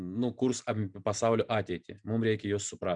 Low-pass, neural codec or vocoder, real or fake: 9.9 kHz; none; real